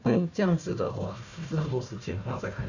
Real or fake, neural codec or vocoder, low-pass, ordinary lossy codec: fake; codec, 16 kHz, 1 kbps, FunCodec, trained on Chinese and English, 50 frames a second; 7.2 kHz; none